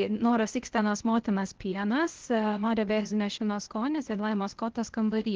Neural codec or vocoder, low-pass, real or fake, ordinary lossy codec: codec, 16 kHz, 0.8 kbps, ZipCodec; 7.2 kHz; fake; Opus, 16 kbps